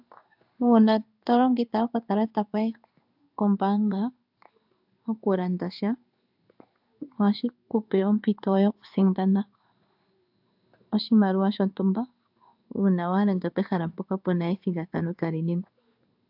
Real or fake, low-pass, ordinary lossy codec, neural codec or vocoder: fake; 5.4 kHz; AAC, 48 kbps; codec, 16 kHz in and 24 kHz out, 1 kbps, XY-Tokenizer